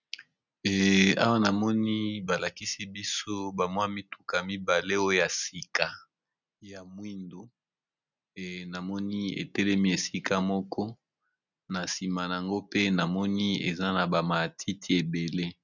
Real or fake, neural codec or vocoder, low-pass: real; none; 7.2 kHz